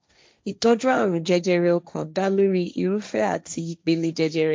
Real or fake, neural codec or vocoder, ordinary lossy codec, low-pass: fake; codec, 16 kHz, 1.1 kbps, Voila-Tokenizer; none; none